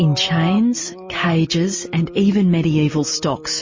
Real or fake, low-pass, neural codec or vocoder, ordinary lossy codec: real; 7.2 kHz; none; MP3, 32 kbps